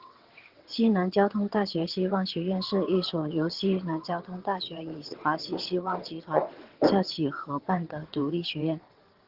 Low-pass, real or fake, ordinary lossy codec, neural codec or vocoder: 5.4 kHz; fake; Opus, 16 kbps; vocoder, 22.05 kHz, 80 mel bands, WaveNeXt